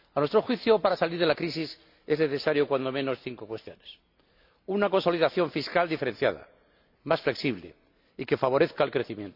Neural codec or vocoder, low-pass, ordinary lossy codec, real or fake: none; 5.4 kHz; AAC, 48 kbps; real